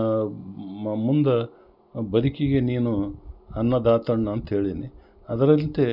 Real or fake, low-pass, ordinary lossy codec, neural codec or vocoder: real; 5.4 kHz; none; none